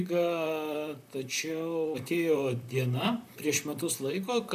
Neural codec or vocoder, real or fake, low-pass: vocoder, 44.1 kHz, 128 mel bands, Pupu-Vocoder; fake; 14.4 kHz